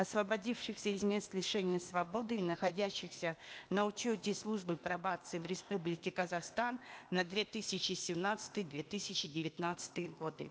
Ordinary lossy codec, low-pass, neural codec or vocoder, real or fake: none; none; codec, 16 kHz, 0.8 kbps, ZipCodec; fake